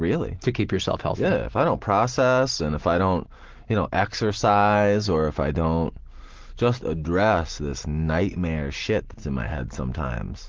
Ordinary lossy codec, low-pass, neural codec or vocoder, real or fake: Opus, 16 kbps; 7.2 kHz; none; real